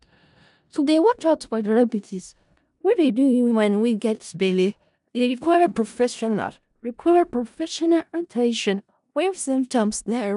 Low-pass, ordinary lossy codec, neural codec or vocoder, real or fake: 10.8 kHz; none; codec, 16 kHz in and 24 kHz out, 0.4 kbps, LongCat-Audio-Codec, four codebook decoder; fake